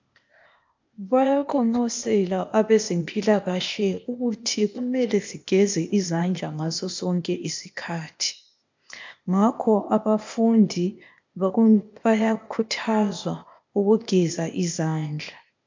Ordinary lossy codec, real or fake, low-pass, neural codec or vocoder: MP3, 64 kbps; fake; 7.2 kHz; codec, 16 kHz, 0.8 kbps, ZipCodec